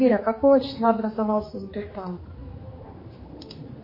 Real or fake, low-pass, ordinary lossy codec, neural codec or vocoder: fake; 5.4 kHz; MP3, 24 kbps; codec, 16 kHz, 2 kbps, X-Codec, HuBERT features, trained on general audio